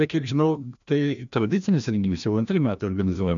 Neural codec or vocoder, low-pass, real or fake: codec, 16 kHz, 1 kbps, FreqCodec, larger model; 7.2 kHz; fake